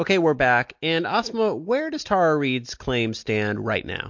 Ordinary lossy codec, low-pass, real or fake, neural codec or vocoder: MP3, 48 kbps; 7.2 kHz; real; none